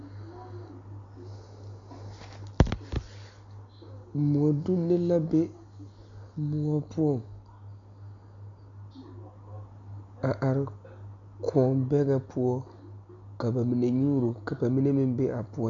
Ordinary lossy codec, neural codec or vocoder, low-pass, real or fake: MP3, 64 kbps; none; 7.2 kHz; real